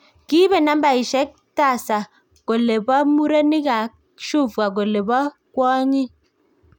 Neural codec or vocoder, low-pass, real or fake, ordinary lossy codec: none; 19.8 kHz; real; none